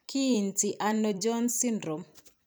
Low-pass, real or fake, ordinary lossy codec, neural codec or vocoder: none; fake; none; vocoder, 44.1 kHz, 128 mel bands every 256 samples, BigVGAN v2